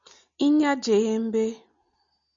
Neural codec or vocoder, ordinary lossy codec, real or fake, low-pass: none; MP3, 64 kbps; real; 7.2 kHz